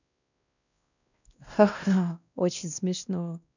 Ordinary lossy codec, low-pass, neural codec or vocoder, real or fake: none; 7.2 kHz; codec, 16 kHz, 1 kbps, X-Codec, WavLM features, trained on Multilingual LibriSpeech; fake